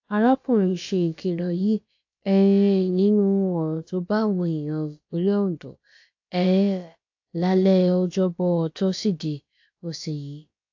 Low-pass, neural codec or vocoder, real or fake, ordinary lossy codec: 7.2 kHz; codec, 16 kHz, about 1 kbps, DyCAST, with the encoder's durations; fake; AAC, 48 kbps